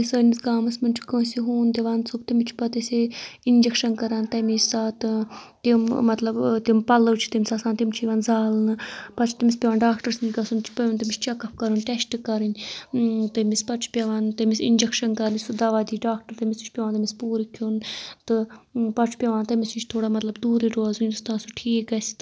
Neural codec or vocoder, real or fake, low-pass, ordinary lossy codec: none; real; none; none